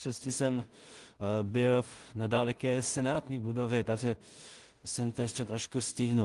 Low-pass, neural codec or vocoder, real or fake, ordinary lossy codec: 10.8 kHz; codec, 16 kHz in and 24 kHz out, 0.4 kbps, LongCat-Audio-Codec, two codebook decoder; fake; Opus, 24 kbps